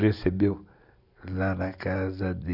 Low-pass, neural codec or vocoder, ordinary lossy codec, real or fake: 5.4 kHz; none; none; real